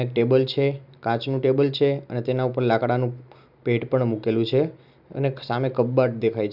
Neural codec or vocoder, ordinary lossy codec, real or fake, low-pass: none; none; real; 5.4 kHz